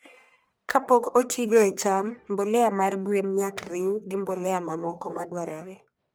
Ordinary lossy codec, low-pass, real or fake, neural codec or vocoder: none; none; fake; codec, 44.1 kHz, 1.7 kbps, Pupu-Codec